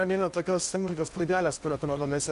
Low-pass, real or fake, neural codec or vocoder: 10.8 kHz; fake; codec, 16 kHz in and 24 kHz out, 0.8 kbps, FocalCodec, streaming, 65536 codes